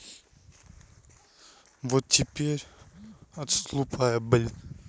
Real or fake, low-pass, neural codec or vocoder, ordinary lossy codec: real; none; none; none